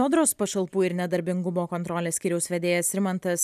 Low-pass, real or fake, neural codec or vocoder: 14.4 kHz; real; none